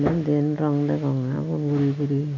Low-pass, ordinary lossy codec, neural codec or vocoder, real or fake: 7.2 kHz; none; none; real